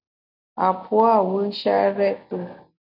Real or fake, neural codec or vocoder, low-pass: real; none; 5.4 kHz